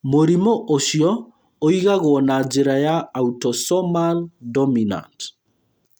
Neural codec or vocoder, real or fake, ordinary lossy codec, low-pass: none; real; none; none